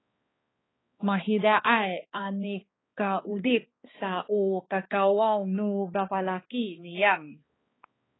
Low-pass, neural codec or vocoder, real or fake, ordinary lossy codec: 7.2 kHz; codec, 16 kHz, 2 kbps, X-Codec, HuBERT features, trained on balanced general audio; fake; AAC, 16 kbps